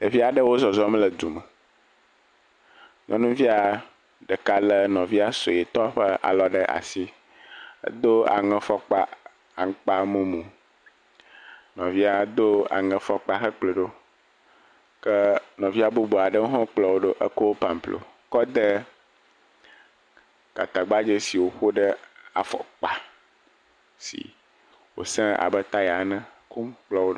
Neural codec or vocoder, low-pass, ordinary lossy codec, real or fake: none; 9.9 kHz; MP3, 96 kbps; real